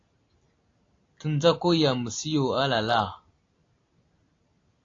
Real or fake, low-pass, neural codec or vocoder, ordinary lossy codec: real; 7.2 kHz; none; AAC, 32 kbps